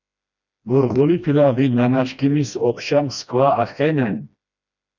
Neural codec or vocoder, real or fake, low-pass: codec, 16 kHz, 1 kbps, FreqCodec, smaller model; fake; 7.2 kHz